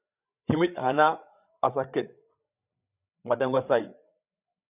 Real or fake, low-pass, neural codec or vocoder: fake; 3.6 kHz; codec, 16 kHz, 8 kbps, FreqCodec, larger model